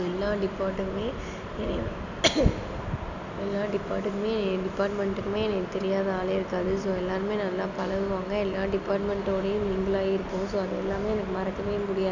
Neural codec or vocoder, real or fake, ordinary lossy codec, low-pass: none; real; none; 7.2 kHz